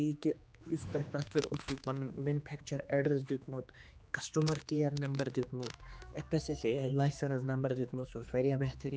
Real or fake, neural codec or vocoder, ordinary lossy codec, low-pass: fake; codec, 16 kHz, 2 kbps, X-Codec, HuBERT features, trained on general audio; none; none